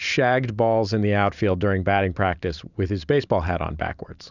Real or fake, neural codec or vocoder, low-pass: fake; vocoder, 44.1 kHz, 128 mel bands every 512 samples, BigVGAN v2; 7.2 kHz